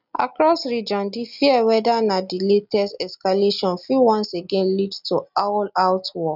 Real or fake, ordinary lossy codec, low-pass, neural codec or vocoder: real; none; 5.4 kHz; none